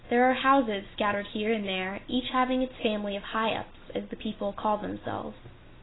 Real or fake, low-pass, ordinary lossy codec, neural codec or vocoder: real; 7.2 kHz; AAC, 16 kbps; none